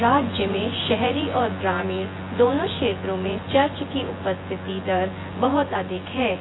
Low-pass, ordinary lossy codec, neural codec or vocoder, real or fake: 7.2 kHz; AAC, 16 kbps; vocoder, 24 kHz, 100 mel bands, Vocos; fake